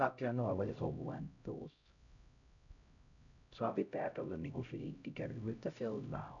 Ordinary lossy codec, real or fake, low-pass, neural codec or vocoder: none; fake; 7.2 kHz; codec, 16 kHz, 0.5 kbps, X-Codec, HuBERT features, trained on LibriSpeech